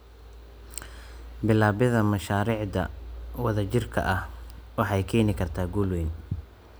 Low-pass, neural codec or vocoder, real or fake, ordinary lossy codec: none; none; real; none